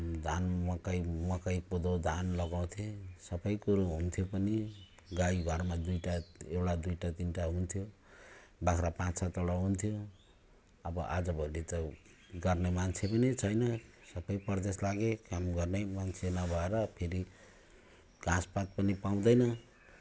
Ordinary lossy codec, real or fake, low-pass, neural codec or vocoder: none; real; none; none